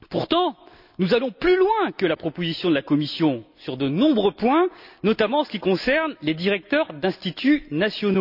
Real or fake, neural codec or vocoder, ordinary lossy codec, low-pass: real; none; none; 5.4 kHz